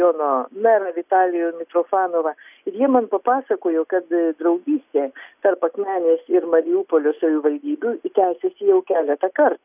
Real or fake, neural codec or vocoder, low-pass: real; none; 3.6 kHz